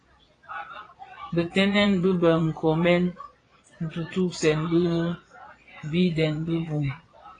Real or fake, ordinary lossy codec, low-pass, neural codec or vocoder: fake; AAC, 32 kbps; 9.9 kHz; vocoder, 22.05 kHz, 80 mel bands, Vocos